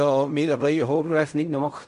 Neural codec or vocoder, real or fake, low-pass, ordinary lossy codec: codec, 16 kHz in and 24 kHz out, 0.4 kbps, LongCat-Audio-Codec, fine tuned four codebook decoder; fake; 10.8 kHz; none